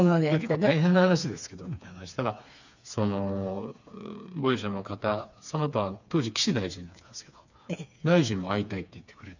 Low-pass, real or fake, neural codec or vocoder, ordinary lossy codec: 7.2 kHz; fake; codec, 16 kHz, 4 kbps, FreqCodec, smaller model; none